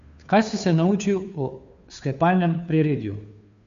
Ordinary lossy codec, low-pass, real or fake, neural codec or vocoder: MP3, 64 kbps; 7.2 kHz; fake; codec, 16 kHz, 2 kbps, FunCodec, trained on Chinese and English, 25 frames a second